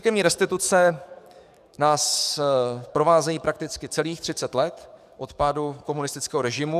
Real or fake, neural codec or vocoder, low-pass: fake; codec, 44.1 kHz, 7.8 kbps, DAC; 14.4 kHz